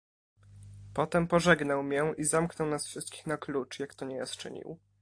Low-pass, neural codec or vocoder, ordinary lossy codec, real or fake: 9.9 kHz; none; AAC, 48 kbps; real